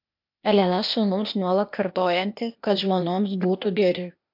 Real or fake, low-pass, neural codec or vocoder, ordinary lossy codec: fake; 5.4 kHz; codec, 16 kHz, 0.8 kbps, ZipCodec; MP3, 48 kbps